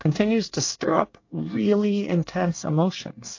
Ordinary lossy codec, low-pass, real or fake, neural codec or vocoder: AAC, 48 kbps; 7.2 kHz; fake; codec, 24 kHz, 1 kbps, SNAC